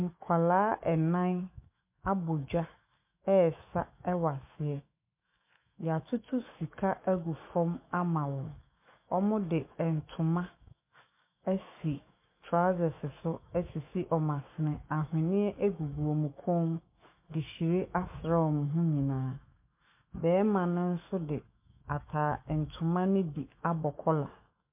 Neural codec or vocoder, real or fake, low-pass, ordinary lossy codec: autoencoder, 48 kHz, 128 numbers a frame, DAC-VAE, trained on Japanese speech; fake; 3.6 kHz; MP3, 32 kbps